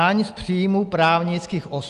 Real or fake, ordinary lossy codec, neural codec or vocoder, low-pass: real; Opus, 32 kbps; none; 10.8 kHz